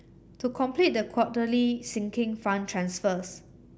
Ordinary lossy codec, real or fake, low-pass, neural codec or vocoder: none; real; none; none